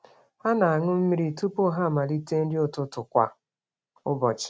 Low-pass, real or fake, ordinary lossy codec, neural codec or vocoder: none; real; none; none